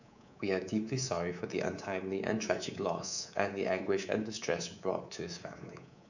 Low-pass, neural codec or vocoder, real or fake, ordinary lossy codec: 7.2 kHz; codec, 24 kHz, 3.1 kbps, DualCodec; fake; none